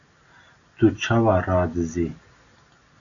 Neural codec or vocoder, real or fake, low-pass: none; real; 7.2 kHz